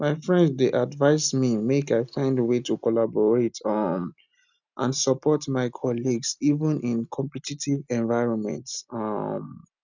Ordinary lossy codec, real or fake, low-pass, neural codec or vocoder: none; real; 7.2 kHz; none